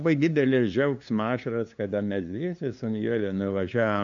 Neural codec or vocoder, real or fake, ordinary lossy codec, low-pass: codec, 16 kHz, 2 kbps, FunCodec, trained on LibriTTS, 25 frames a second; fake; AAC, 64 kbps; 7.2 kHz